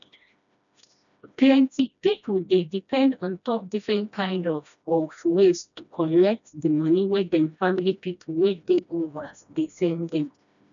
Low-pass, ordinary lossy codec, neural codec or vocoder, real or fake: 7.2 kHz; none; codec, 16 kHz, 1 kbps, FreqCodec, smaller model; fake